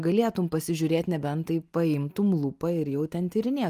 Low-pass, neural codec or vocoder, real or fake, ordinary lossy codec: 14.4 kHz; none; real; Opus, 32 kbps